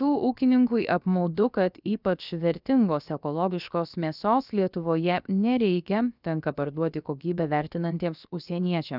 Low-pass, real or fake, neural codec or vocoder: 5.4 kHz; fake; codec, 16 kHz, about 1 kbps, DyCAST, with the encoder's durations